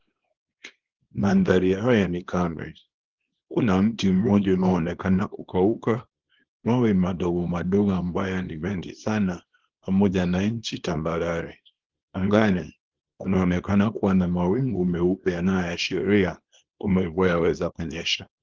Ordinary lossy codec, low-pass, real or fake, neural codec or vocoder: Opus, 16 kbps; 7.2 kHz; fake; codec, 24 kHz, 0.9 kbps, WavTokenizer, small release